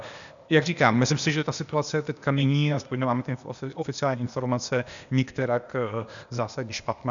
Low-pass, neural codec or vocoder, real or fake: 7.2 kHz; codec, 16 kHz, 0.8 kbps, ZipCodec; fake